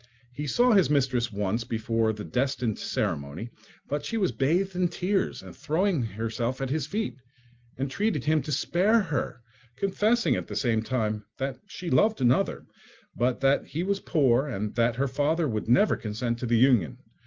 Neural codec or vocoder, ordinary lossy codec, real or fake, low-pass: none; Opus, 24 kbps; real; 7.2 kHz